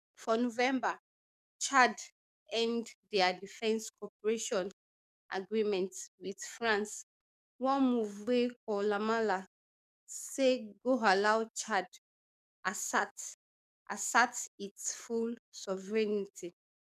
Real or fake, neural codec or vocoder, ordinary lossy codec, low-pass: fake; codec, 44.1 kHz, 7.8 kbps, DAC; none; 14.4 kHz